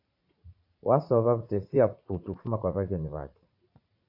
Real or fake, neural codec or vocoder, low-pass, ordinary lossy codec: fake; vocoder, 44.1 kHz, 80 mel bands, Vocos; 5.4 kHz; AAC, 48 kbps